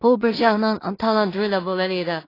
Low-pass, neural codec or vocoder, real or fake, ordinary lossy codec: 5.4 kHz; codec, 16 kHz in and 24 kHz out, 0.4 kbps, LongCat-Audio-Codec, two codebook decoder; fake; AAC, 24 kbps